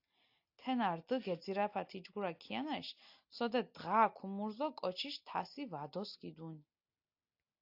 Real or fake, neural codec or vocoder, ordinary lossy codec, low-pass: real; none; AAC, 48 kbps; 5.4 kHz